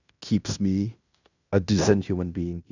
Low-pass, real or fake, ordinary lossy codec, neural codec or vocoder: 7.2 kHz; fake; none; codec, 16 kHz in and 24 kHz out, 0.9 kbps, LongCat-Audio-Codec, fine tuned four codebook decoder